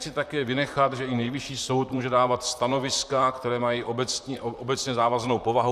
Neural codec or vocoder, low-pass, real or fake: vocoder, 44.1 kHz, 128 mel bands, Pupu-Vocoder; 14.4 kHz; fake